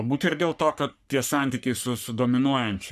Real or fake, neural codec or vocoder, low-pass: fake; codec, 44.1 kHz, 3.4 kbps, Pupu-Codec; 14.4 kHz